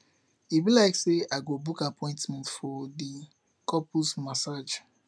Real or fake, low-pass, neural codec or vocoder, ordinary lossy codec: real; none; none; none